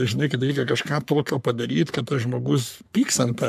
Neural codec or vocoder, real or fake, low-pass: codec, 44.1 kHz, 3.4 kbps, Pupu-Codec; fake; 14.4 kHz